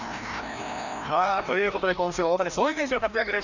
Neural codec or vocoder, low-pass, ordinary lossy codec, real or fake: codec, 16 kHz, 1 kbps, FreqCodec, larger model; 7.2 kHz; none; fake